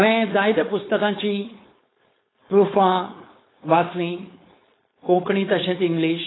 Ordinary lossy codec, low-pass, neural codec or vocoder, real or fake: AAC, 16 kbps; 7.2 kHz; codec, 16 kHz, 4.8 kbps, FACodec; fake